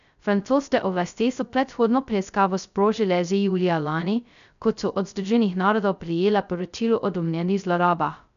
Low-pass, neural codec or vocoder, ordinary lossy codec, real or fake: 7.2 kHz; codec, 16 kHz, 0.2 kbps, FocalCodec; none; fake